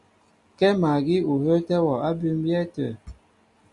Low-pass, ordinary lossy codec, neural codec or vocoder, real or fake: 10.8 kHz; Opus, 64 kbps; none; real